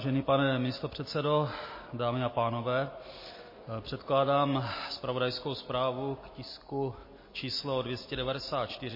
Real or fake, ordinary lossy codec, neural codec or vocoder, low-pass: real; MP3, 24 kbps; none; 5.4 kHz